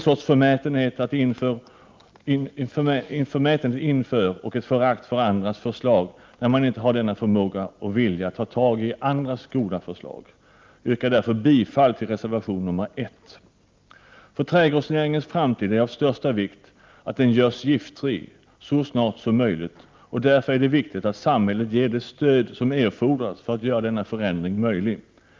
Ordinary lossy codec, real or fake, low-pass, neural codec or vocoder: Opus, 24 kbps; real; 7.2 kHz; none